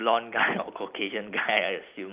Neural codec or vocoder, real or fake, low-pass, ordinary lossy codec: none; real; 3.6 kHz; Opus, 24 kbps